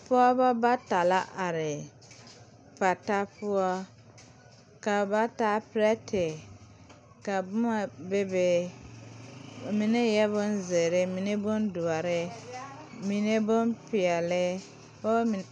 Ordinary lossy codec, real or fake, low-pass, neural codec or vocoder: MP3, 96 kbps; real; 9.9 kHz; none